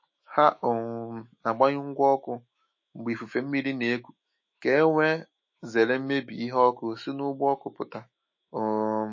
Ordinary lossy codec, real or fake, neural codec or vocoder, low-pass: MP3, 32 kbps; fake; autoencoder, 48 kHz, 128 numbers a frame, DAC-VAE, trained on Japanese speech; 7.2 kHz